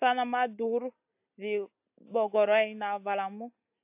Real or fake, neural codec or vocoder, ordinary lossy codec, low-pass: real; none; AAC, 32 kbps; 3.6 kHz